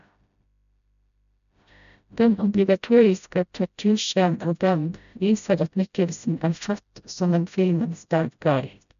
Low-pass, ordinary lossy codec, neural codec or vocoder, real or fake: 7.2 kHz; none; codec, 16 kHz, 0.5 kbps, FreqCodec, smaller model; fake